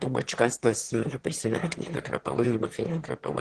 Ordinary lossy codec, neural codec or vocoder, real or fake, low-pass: Opus, 16 kbps; autoencoder, 22.05 kHz, a latent of 192 numbers a frame, VITS, trained on one speaker; fake; 9.9 kHz